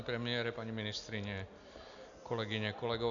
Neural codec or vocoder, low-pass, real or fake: none; 7.2 kHz; real